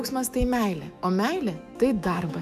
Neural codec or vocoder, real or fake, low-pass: autoencoder, 48 kHz, 128 numbers a frame, DAC-VAE, trained on Japanese speech; fake; 14.4 kHz